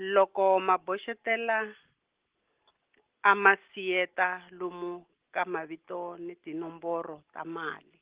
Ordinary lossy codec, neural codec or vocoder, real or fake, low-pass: Opus, 16 kbps; none; real; 3.6 kHz